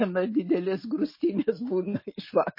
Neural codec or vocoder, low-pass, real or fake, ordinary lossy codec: none; 5.4 kHz; real; MP3, 24 kbps